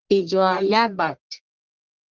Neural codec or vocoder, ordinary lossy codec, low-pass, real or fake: codec, 44.1 kHz, 1.7 kbps, Pupu-Codec; Opus, 16 kbps; 7.2 kHz; fake